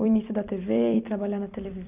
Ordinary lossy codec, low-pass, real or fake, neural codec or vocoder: none; 3.6 kHz; fake; vocoder, 44.1 kHz, 128 mel bands every 256 samples, BigVGAN v2